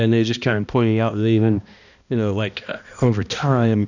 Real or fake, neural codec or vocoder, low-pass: fake; codec, 16 kHz, 1 kbps, X-Codec, HuBERT features, trained on balanced general audio; 7.2 kHz